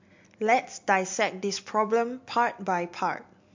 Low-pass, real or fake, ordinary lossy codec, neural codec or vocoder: 7.2 kHz; real; MP3, 48 kbps; none